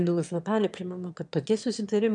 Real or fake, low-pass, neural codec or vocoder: fake; 9.9 kHz; autoencoder, 22.05 kHz, a latent of 192 numbers a frame, VITS, trained on one speaker